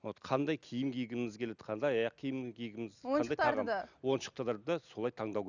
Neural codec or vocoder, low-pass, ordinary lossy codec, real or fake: none; 7.2 kHz; none; real